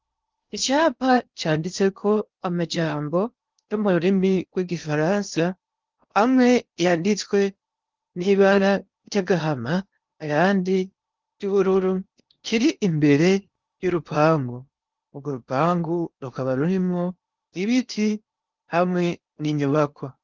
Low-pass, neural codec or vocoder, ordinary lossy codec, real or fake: 7.2 kHz; codec, 16 kHz in and 24 kHz out, 0.8 kbps, FocalCodec, streaming, 65536 codes; Opus, 24 kbps; fake